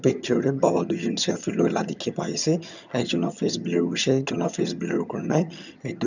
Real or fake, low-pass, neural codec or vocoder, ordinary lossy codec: fake; 7.2 kHz; vocoder, 22.05 kHz, 80 mel bands, HiFi-GAN; none